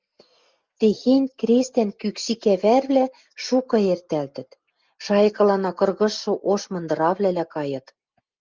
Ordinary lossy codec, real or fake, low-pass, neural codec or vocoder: Opus, 24 kbps; real; 7.2 kHz; none